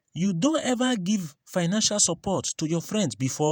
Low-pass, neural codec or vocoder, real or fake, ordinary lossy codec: none; vocoder, 48 kHz, 128 mel bands, Vocos; fake; none